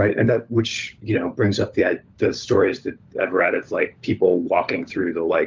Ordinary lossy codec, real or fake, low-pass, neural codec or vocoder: Opus, 24 kbps; fake; 7.2 kHz; codec, 16 kHz, 16 kbps, FunCodec, trained on LibriTTS, 50 frames a second